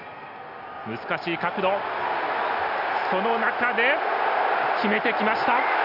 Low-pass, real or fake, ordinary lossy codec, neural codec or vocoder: 5.4 kHz; real; none; none